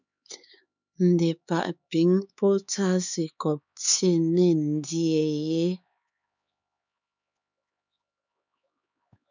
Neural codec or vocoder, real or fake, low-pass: codec, 16 kHz, 4 kbps, X-Codec, HuBERT features, trained on LibriSpeech; fake; 7.2 kHz